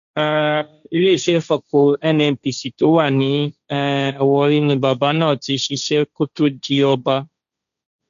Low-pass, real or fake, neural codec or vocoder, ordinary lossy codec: 7.2 kHz; fake; codec, 16 kHz, 1.1 kbps, Voila-Tokenizer; none